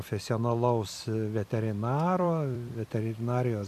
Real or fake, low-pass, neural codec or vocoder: fake; 14.4 kHz; vocoder, 44.1 kHz, 128 mel bands every 256 samples, BigVGAN v2